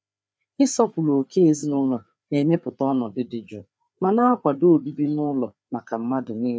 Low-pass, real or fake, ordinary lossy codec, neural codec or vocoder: none; fake; none; codec, 16 kHz, 4 kbps, FreqCodec, larger model